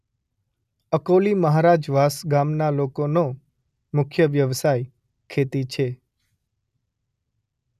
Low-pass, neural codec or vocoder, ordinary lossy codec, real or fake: 14.4 kHz; none; none; real